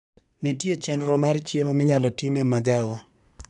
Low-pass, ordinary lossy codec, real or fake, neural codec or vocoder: 10.8 kHz; none; fake; codec, 24 kHz, 1 kbps, SNAC